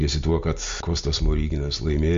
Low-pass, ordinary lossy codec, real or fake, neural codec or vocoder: 7.2 kHz; MP3, 48 kbps; real; none